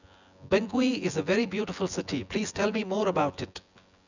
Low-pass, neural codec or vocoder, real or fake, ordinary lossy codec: 7.2 kHz; vocoder, 24 kHz, 100 mel bands, Vocos; fake; none